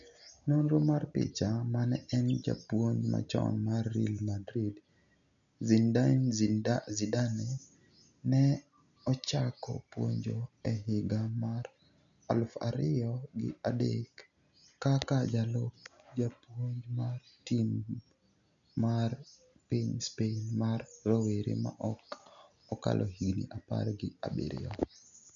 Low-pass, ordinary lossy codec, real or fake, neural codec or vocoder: 7.2 kHz; none; real; none